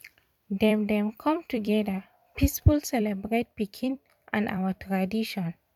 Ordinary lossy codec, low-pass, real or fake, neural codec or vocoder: none; 19.8 kHz; fake; vocoder, 44.1 kHz, 128 mel bands every 256 samples, BigVGAN v2